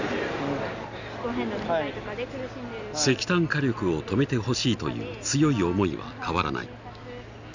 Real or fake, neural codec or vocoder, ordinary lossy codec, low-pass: real; none; none; 7.2 kHz